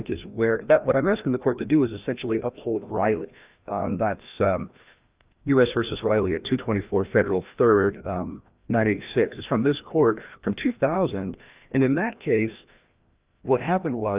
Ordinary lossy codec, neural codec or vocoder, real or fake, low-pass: Opus, 24 kbps; codec, 16 kHz, 1 kbps, FreqCodec, larger model; fake; 3.6 kHz